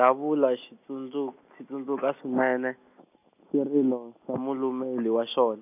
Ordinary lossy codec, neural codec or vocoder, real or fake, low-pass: none; none; real; 3.6 kHz